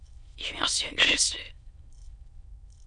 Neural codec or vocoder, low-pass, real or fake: autoencoder, 22.05 kHz, a latent of 192 numbers a frame, VITS, trained on many speakers; 9.9 kHz; fake